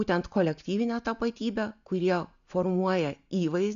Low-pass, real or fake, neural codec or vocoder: 7.2 kHz; real; none